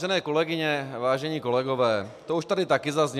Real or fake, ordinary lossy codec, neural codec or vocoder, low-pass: real; MP3, 96 kbps; none; 14.4 kHz